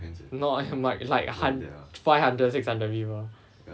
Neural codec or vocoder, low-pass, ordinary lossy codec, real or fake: none; none; none; real